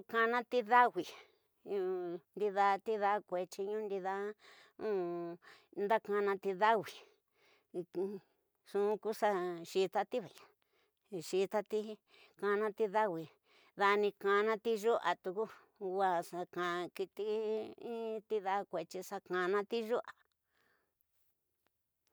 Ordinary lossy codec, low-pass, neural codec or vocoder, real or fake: none; none; none; real